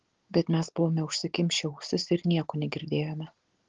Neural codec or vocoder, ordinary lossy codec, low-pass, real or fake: none; Opus, 16 kbps; 7.2 kHz; real